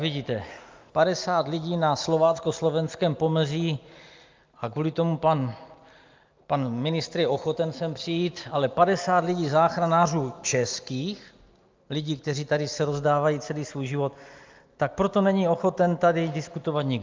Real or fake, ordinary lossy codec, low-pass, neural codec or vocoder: real; Opus, 24 kbps; 7.2 kHz; none